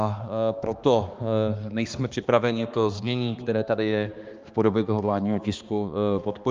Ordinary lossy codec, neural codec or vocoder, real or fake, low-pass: Opus, 24 kbps; codec, 16 kHz, 2 kbps, X-Codec, HuBERT features, trained on balanced general audio; fake; 7.2 kHz